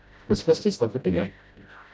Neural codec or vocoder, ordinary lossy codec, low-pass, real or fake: codec, 16 kHz, 0.5 kbps, FreqCodec, smaller model; none; none; fake